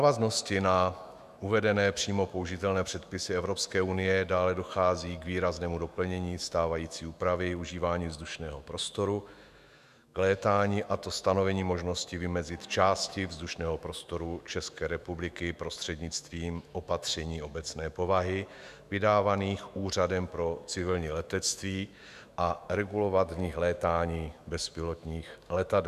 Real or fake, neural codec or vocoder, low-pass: fake; autoencoder, 48 kHz, 128 numbers a frame, DAC-VAE, trained on Japanese speech; 14.4 kHz